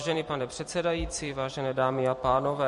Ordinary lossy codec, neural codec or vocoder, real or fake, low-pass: MP3, 48 kbps; vocoder, 44.1 kHz, 128 mel bands every 256 samples, BigVGAN v2; fake; 14.4 kHz